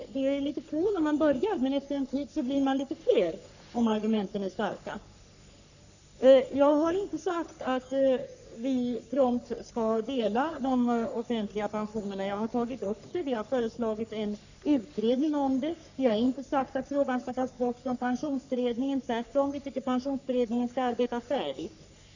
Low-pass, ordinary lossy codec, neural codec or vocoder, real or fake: 7.2 kHz; none; codec, 44.1 kHz, 3.4 kbps, Pupu-Codec; fake